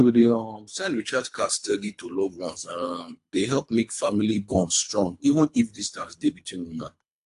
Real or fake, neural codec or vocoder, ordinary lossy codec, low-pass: fake; codec, 24 kHz, 3 kbps, HILCodec; AAC, 64 kbps; 10.8 kHz